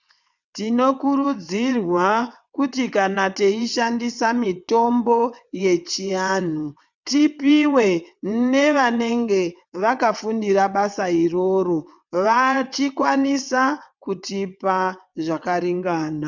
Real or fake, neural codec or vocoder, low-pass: fake; vocoder, 22.05 kHz, 80 mel bands, WaveNeXt; 7.2 kHz